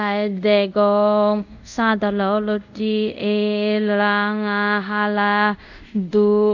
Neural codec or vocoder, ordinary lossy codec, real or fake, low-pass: codec, 24 kHz, 0.5 kbps, DualCodec; none; fake; 7.2 kHz